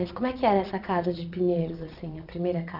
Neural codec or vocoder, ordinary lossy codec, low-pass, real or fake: vocoder, 22.05 kHz, 80 mel bands, Vocos; none; 5.4 kHz; fake